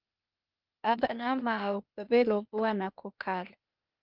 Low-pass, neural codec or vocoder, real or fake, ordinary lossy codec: 5.4 kHz; codec, 16 kHz, 0.8 kbps, ZipCodec; fake; Opus, 24 kbps